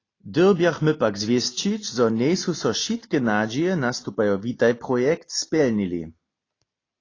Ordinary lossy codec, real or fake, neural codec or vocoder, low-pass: AAC, 32 kbps; real; none; 7.2 kHz